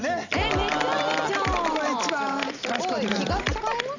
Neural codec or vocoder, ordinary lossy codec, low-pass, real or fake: none; none; 7.2 kHz; real